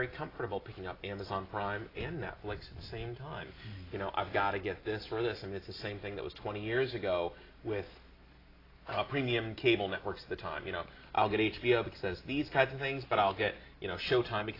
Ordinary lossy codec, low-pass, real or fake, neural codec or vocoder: AAC, 24 kbps; 5.4 kHz; real; none